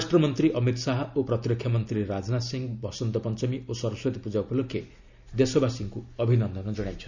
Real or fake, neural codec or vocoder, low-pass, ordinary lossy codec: real; none; 7.2 kHz; none